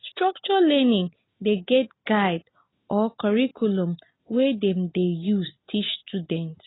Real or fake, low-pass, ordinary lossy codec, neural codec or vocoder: real; 7.2 kHz; AAC, 16 kbps; none